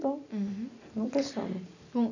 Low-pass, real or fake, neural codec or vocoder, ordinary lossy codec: 7.2 kHz; real; none; none